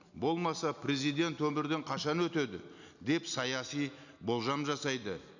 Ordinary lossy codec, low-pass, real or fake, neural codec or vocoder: none; 7.2 kHz; real; none